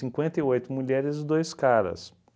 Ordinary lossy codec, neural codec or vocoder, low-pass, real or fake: none; none; none; real